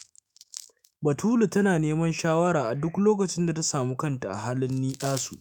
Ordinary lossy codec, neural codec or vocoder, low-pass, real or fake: none; autoencoder, 48 kHz, 128 numbers a frame, DAC-VAE, trained on Japanese speech; none; fake